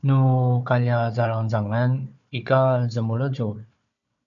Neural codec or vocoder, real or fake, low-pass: codec, 16 kHz, 4 kbps, FunCodec, trained on Chinese and English, 50 frames a second; fake; 7.2 kHz